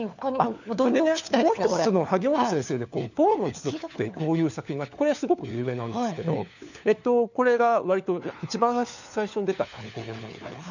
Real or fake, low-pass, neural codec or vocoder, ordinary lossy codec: fake; 7.2 kHz; codec, 16 kHz, 4 kbps, FunCodec, trained on LibriTTS, 50 frames a second; none